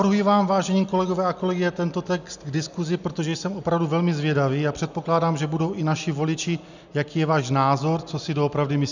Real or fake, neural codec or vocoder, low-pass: real; none; 7.2 kHz